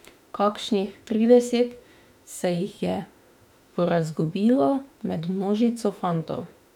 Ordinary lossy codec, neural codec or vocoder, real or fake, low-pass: none; autoencoder, 48 kHz, 32 numbers a frame, DAC-VAE, trained on Japanese speech; fake; 19.8 kHz